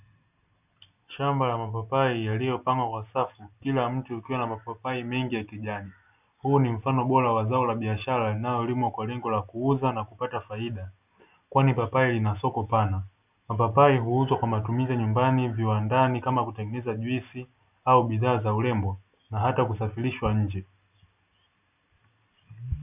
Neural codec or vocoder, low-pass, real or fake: none; 3.6 kHz; real